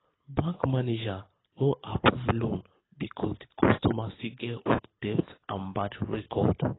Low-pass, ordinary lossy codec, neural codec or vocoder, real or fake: 7.2 kHz; AAC, 16 kbps; codec, 24 kHz, 6 kbps, HILCodec; fake